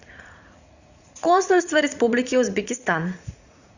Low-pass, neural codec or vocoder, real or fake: 7.2 kHz; vocoder, 44.1 kHz, 80 mel bands, Vocos; fake